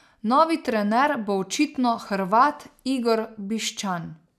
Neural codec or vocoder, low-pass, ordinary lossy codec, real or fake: none; 14.4 kHz; none; real